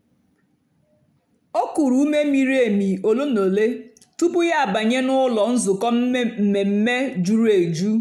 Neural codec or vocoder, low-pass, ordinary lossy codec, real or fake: none; 19.8 kHz; none; real